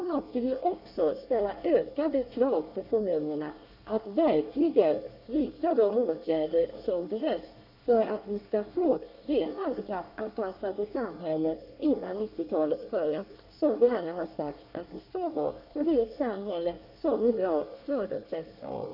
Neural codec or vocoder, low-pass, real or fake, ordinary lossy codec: codec, 24 kHz, 1 kbps, SNAC; 5.4 kHz; fake; none